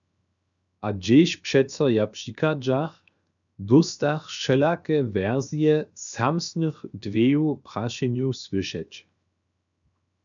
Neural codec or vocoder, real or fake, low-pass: codec, 16 kHz, 0.7 kbps, FocalCodec; fake; 7.2 kHz